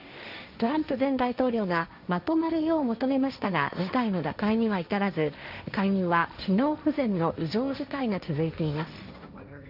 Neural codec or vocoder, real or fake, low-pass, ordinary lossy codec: codec, 16 kHz, 1.1 kbps, Voila-Tokenizer; fake; 5.4 kHz; none